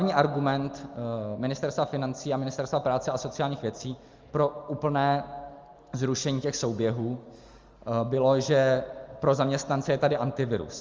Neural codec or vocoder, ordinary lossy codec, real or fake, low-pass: none; Opus, 32 kbps; real; 7.2 kHz